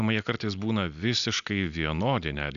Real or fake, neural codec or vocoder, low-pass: real; none; 7.2 kHz